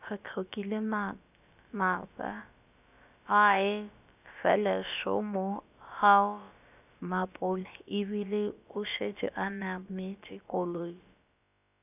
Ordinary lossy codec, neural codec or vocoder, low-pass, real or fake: none; codec, 16 kHz, about 1 kbps, DyCAST, with the encoder's durations; 3.6 kHz; fake